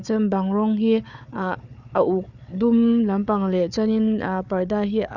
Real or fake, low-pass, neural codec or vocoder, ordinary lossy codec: fake; 7.2 kHz; codec, 16 kHz, 4 kbps, FunCodec, trained on Chinese and English, 50 frames a second; none